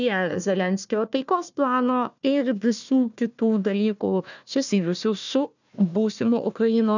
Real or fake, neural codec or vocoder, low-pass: fake; codec, 16 kHz, 1 kbps, FunCodec, trained on Chinese and English, 50 frames a second; 7.2 kHz